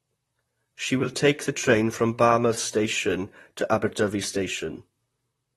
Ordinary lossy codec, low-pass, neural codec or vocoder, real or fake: AAC, 32 kbps; 19.8 kHz; vocoder, 44.1 kHz, 128 mel bands, Pupu-Vocoder; fake